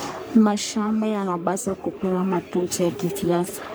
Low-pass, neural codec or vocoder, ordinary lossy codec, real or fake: none; codec, 44.1 kHz, 3.4 kbps, Pupu-Codec; none; fake